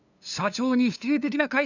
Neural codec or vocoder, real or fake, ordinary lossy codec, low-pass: codec, 16 kHz, 2 kbps, FunCodec, trained on LibriTTS, 25 frames a second; fake; none; 7.2 kHz